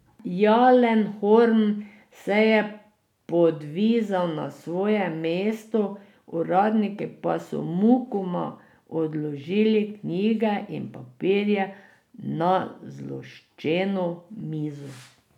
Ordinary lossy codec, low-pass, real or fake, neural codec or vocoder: none; 19.8 kHz; real; none